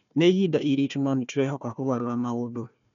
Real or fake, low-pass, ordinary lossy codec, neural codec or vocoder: fake; 7.2 kHz; none; codec, 16 kHz, 1 kbps, FunCodec, trained on Chinese and English, 50 frames a second